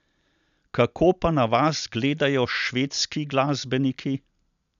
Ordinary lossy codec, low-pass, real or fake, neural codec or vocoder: none; 7.2 kHz; real; none